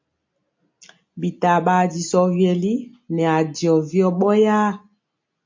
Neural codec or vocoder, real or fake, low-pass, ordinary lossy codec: none; real; 7.2 kHz; MP3, 48 kbps